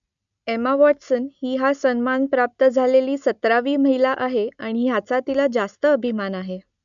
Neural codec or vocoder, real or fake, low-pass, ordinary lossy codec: none; real; 7.2 kHz; none